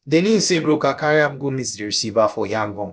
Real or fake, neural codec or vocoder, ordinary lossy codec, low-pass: fake; codec, 16 kHz, about 1 kbps, DyCAST, with the encoder's durations; none; none